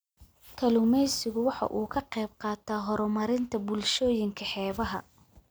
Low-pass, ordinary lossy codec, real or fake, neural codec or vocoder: none; none; real; none